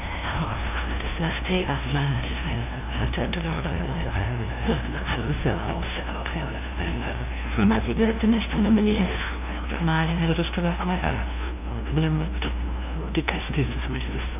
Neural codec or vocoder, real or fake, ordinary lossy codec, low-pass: codec, 16 kHz, 0.5 kbps, FunCodec, trained on LibriTTS, 25 frames a second; fake; none; 3.6 kHz